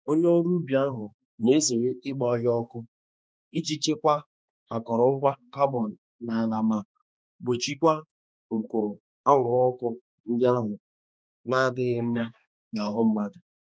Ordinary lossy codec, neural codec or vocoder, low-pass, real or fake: none; codec, 16 kHz, 2 kbps, X-Codec, HuBERT features, trained on balanced general audio; none; fake